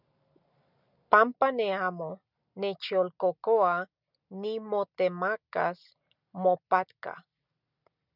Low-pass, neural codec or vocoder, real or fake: 5.4 kHz; none; real